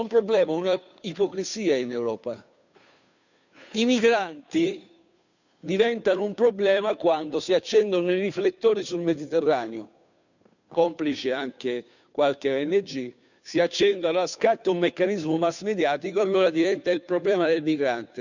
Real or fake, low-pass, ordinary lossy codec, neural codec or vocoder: fake; 7.2 kHz; none; codec, 16 kHz, 2 kbps, FunCodec, trained on Chinese and English, 25 frames a second